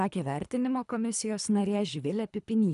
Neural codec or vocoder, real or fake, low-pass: codec, 24 kHz, 3 kbps, HILCodec; fake; 10.8 kHz